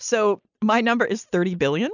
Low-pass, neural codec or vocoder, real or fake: 7.2 kHz; none; real